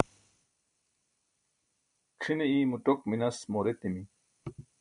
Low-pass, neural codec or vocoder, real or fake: 9.9 kHz; none; real